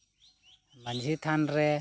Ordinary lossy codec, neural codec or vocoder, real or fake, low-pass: none; none; real; none